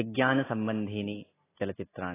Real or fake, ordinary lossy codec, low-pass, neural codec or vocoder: real; AAC, 16 kbps; 3.6 kHz; none